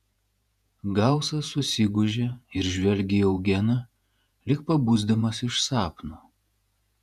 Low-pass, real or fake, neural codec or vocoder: 14.4 kHz; fake; vocoder, 48 kHz, 128 mel bands, Vocos